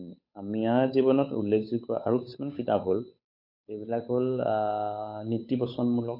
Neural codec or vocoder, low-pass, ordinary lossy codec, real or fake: codec, 16 kHz, 8 kbps, FunCodec, trained on Chinese and English, 25 frames a second; 5.4 kHz; MP3, 32 kbps; fake